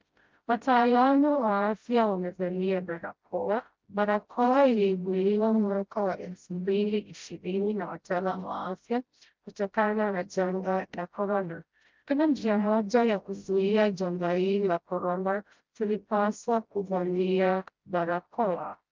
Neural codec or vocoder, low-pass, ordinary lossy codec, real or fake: codec, 16 kHz, 0.5 kbps, FreqCodec, smaller model; 7.2 kHz; Opus, 24 kbps; fake